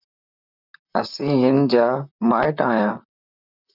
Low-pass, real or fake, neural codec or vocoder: 5.4 kHz; fake; vocoder, 44.1 kHz, 128 mel bands, Pupu-Vocoder